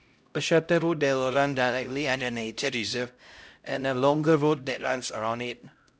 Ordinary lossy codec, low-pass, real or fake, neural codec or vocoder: none; none; fake; codec, 16 kHz, 0.5 kbps, X-Codec, HuBERT features, trained on LibriSpeech